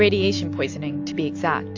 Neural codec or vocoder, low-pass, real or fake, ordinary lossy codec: none; 7.2 kHz; real; AAC, 48 kbps